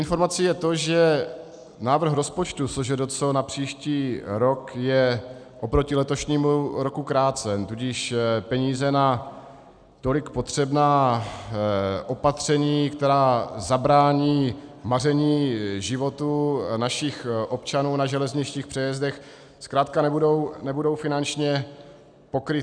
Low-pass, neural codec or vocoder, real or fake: 9.9 kHz; none; real